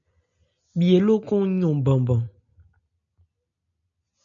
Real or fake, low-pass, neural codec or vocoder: real; 7.2 kHz; none